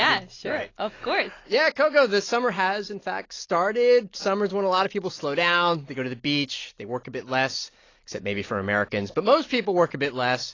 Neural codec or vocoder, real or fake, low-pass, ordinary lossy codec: none; real; 7.2 kHz; AAC, 32 kbps